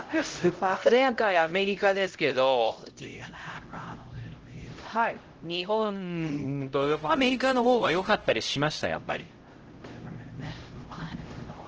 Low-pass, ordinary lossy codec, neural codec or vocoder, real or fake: 7.2 kHz; Opus, 16 kbps; codec, 16 kHz, 0.5 kbps, X-Codec, HuBERT features, trained on LibriSpeech; fake